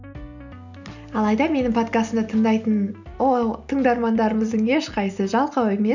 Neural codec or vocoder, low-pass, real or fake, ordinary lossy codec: none; 7.2 kHz; real; none